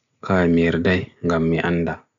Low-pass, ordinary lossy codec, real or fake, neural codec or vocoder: 7.2 kHz; none; real; none